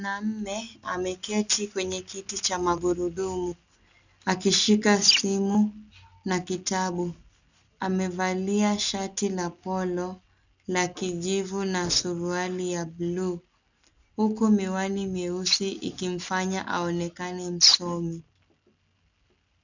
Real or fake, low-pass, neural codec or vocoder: real; 7.2 kHz; none